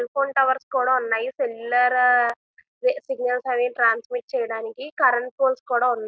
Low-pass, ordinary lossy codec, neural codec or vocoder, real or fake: none; none; none; real